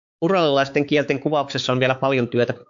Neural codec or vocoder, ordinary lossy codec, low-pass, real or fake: codec, 16 kHz, 4 kbps, X-Codec, HuBERT features, trained on balanced general audio; AAC, 64 kbps; 7.2 kHz; fake